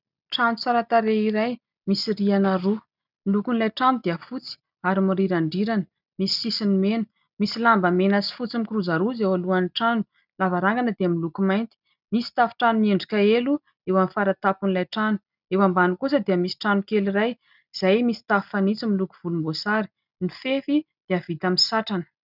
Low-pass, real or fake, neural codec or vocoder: 5.4 kHz; real; none